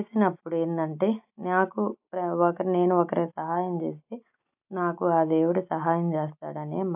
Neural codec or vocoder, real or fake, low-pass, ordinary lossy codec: none; real; 3.6 kHz; AAC, 32 kbps